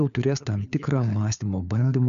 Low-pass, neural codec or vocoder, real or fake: 7.2 kHz; codec, 16 kHz, 4 kbps, FunCodec, trained on LibriTTS, 50 frames a second; fake